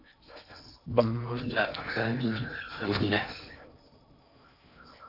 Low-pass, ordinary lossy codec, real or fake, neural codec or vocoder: 5.4 kHz; AAC, 32 kbps; fake; codec, 16 kHz in and 24 kHz out, 0.6 kbps, FocalCodec, streaming, 2048 codes